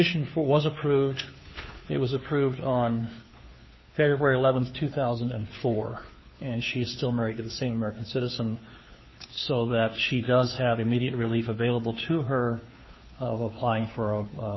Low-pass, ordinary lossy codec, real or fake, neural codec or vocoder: 7.2 kHz; MP3, 24 kbps; fake; codec, 16 kHz, 2 kbps, FunCodec, trained on Chinese and English, 25 frames a second